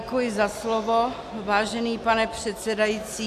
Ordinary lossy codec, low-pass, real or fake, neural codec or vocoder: AAC, 64 kbps; 14.4 kHz; real; none